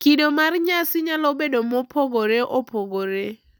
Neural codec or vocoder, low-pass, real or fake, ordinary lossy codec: none; none; real; none